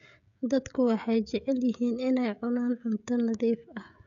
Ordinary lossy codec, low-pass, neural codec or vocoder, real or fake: none; 7.2 kHz; codec, 16 kHz, 16 kbps, FreqCodec, smaller model; fake